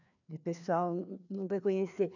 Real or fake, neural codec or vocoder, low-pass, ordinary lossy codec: fake; codec, 16 kHz, 2 kbps, FreqCodec, larger model; 7.2 kHz; none